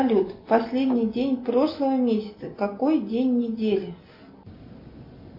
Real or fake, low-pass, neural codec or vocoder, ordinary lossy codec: real; 5.4 kHz; none; MP3, 32 kbps